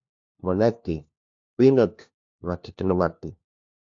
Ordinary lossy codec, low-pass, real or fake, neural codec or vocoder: AAC, 64 kbps; 7.2 kHz; fake; codec, 16 kHz, 1 kbps, FunCodec, trained on LibriTTS, 50 frames a second